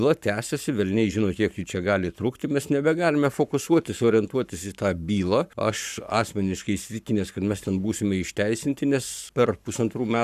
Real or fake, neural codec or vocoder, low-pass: fake; codec, 44.1 kHz, 7.8 kbps, Pupu-Codec; 14.4 kHz